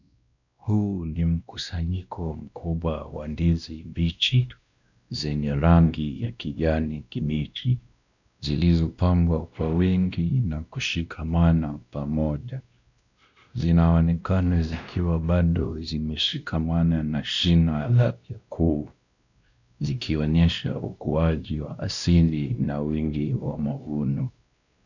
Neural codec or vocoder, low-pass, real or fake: codec, 16 kHz, 1 kbps, X-Codec, WavLM features, trained on Multilingual LibriSpeech; 7.2 kHz; fake